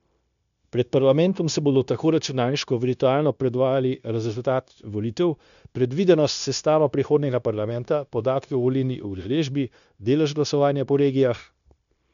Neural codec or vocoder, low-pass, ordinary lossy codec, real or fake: codec, 16 kHz, 0.9 kbps, LongCat-Audio-Codec; 7.2 kHz; none; fake